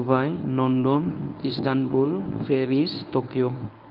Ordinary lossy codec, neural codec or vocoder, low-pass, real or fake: Opus, 24 kbps; codec, 24 kHz, 0.9 kbps, WavTokenizer, medium speech release version 1; 5.4 kHz; fake